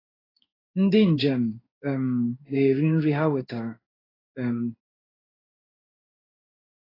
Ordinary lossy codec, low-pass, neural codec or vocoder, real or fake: AAC, 24 kbps; 5.4 kHz; codec, 16 kHz in and 24 kHz out, 1 kbps, XY-Tokenizer; fake